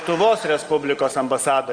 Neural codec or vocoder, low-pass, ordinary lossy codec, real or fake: none; 9.9 kHz; Opus, 24 kbps; real